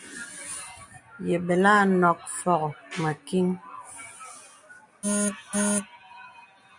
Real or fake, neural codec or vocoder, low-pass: real; none; 10.8 kHz